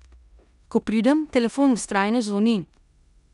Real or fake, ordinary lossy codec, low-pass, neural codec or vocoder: fake; none; 10.8 kHz; codec, 16 kHz in and 24 kHz out, 0.9 kbps, LongCat-Audio-Codec, four codebook decoder